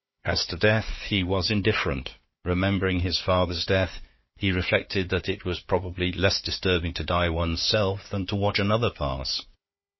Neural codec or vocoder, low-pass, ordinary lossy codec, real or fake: codec, 16 kHz, 4 kbps, FunCodec, trained on Chinese and English, 50 frames a second; 7.2 kHz; MP3, 24 kbps; fake